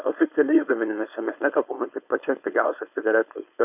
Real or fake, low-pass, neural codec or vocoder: fake; 3.6 kHz; codec, 16 kHz, 4.8 kbps, FACodec